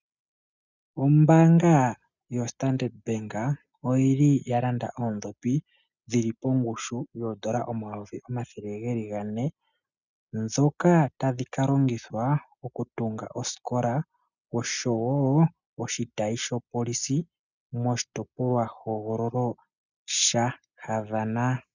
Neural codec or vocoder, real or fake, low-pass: none; real; 7.2 kHz